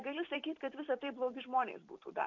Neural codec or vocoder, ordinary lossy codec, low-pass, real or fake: vocoder, 44.1 kHz, 128 mel bands every 256 samples, BigVGAN v2; MP3, 48 kbps; 7.2 kHz; fake